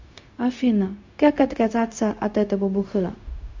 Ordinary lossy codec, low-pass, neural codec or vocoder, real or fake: MP3, 48 kbps; 7.2 kHz; codec, 16 kHz, 0.4 kbps, LongCat-Audio-Codec; fake